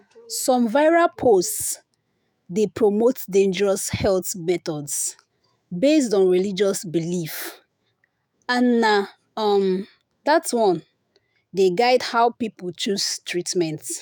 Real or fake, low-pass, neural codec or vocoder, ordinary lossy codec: fake; none; autoencoder, 48 kHz, 128 numbers a frame, DAC-VAE, trained on Japanese speech; none